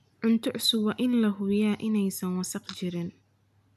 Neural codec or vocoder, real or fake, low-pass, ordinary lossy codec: none; real; 14.4 kHz; none